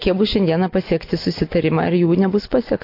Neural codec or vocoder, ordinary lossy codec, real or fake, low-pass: none; AAC, 32 kbps; real; 5.4 kHz